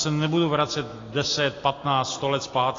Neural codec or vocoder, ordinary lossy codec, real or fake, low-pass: none; AAC, 32 kbps; real; 7.2 kHz